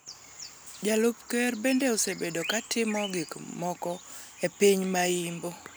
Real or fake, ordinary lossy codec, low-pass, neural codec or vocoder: real; none; none; none